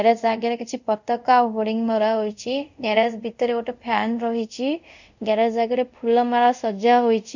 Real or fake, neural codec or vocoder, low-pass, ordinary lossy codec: fake; codec, 24 kHz, 0.5 kbps, DualCodec; 7.2 kHz; none